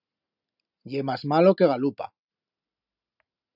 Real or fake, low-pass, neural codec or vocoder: real; 5.4 kHz; none